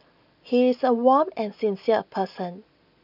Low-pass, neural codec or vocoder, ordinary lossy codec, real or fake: 5.4 kHz; none; none; real